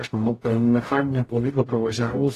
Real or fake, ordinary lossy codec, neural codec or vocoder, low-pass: fake; AAC, 48 kbps; codec, 44.1 kHz, 0.9 kbps, DAC; 14.4 kHz